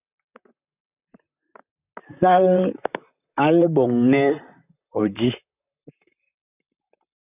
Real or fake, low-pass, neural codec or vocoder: fake; 3.6 kHz; vocoder, 44.1 kHz, 128 mel bands, Pupu-Vocoder